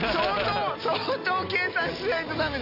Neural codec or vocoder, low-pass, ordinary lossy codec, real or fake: none; 5.4 kHz; AAC, 48 kbps; real